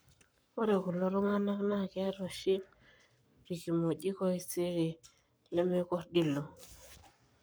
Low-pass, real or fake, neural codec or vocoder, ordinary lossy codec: none; fake; codec, 44.1 kHz, 7.8 kbps, Pupu-Codec; none